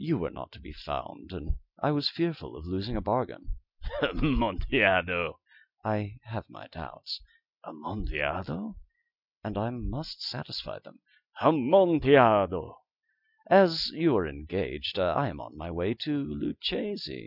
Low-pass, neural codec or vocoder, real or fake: 5.4 kHz; none; real